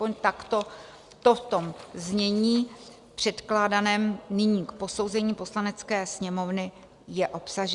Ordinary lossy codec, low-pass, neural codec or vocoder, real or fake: Opus, 64 kbps; 10.8 kHz; none; real